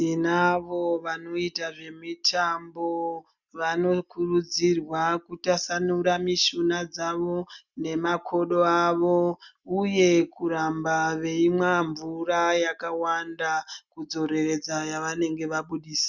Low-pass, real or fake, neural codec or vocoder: 7.2 kHz; real; none